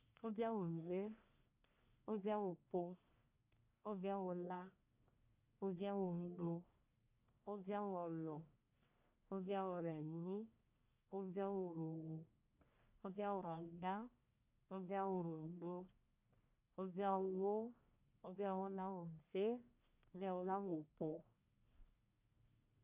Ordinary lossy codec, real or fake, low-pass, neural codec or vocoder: none; fake; 3.6 kHz; codec, 44.1 kHz, 1.7 kbps, Pupu-Codec